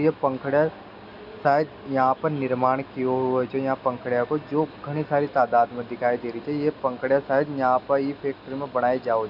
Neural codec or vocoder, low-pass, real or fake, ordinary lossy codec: none; 5.4 kHz; real; none